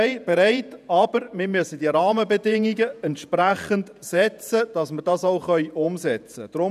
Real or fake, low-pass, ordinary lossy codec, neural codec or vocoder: fake; 14.4 kHz; none; vocoder, 48 kHz, 128 mel bands, Vocos